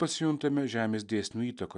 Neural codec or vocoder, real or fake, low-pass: none; real; 10.8 kHz